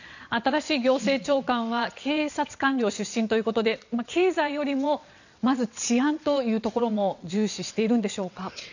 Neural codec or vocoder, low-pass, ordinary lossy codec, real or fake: vocoder, 22.05 kHz, 80 mel bands, WaveNeXt; 7.2 kHz; none; fake